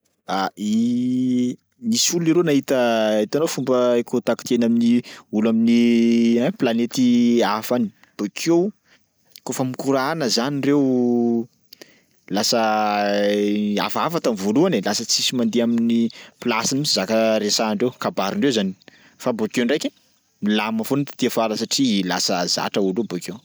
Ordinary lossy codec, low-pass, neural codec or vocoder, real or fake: none; none; none; real